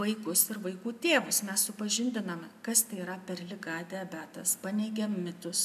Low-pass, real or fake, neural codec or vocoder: 14.4 kHz; real; none